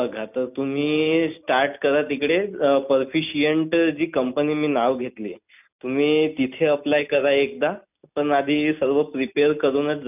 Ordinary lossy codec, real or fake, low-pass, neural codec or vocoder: none; real; 3.6 kHz; none